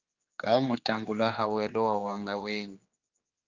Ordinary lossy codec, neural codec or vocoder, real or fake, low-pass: Opus, 24 kbps; codec, 16 kHz, 2 kbps, X-Codec, HuBERT features, trained on general audio; fake; 7.2 kHz